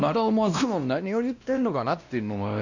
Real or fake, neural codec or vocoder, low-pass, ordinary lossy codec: fake; codec, 16 kHz, 1 kbps, X-Codec, WavLM features, trained on Multilingual LibriSpeech; 7.2 kHz; none